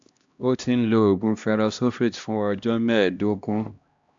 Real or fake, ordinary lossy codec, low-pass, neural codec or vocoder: fake; AAC, 64 kbps; 7.2 kHz; codec, 16 kHz, 1 kbps, X-Codec, HuBERT features, trained on LibriSpeech